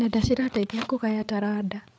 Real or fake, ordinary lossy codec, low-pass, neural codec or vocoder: fake; none; none; codec, 16 kHz, 8 kbps, FreqCodec, larger model